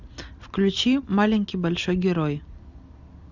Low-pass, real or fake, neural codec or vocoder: 7.2 kHz; real; none